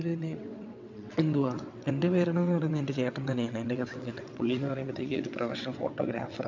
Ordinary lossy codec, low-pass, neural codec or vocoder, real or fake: MP3, 64 kbps; 7.2 kHz; vocoder, 22.05 kHz, 80 mel bands, WaveNeXt; fake